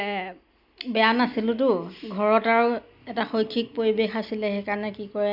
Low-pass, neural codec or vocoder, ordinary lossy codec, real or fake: 5.4 kHz; none; none; real